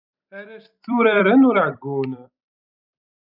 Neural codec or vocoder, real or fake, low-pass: vocoder, 44.1 kHz, 128 mel bands every 256 samples, BigVGAN v2; fake; 5.4 kHz